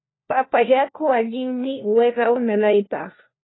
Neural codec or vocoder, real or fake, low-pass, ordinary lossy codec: codec, 16 kHz, 1 kbps, FunCodec, trained on LibriTTS, 50 frames a second; fake; 7.2 kHz; AAC, 16 kbps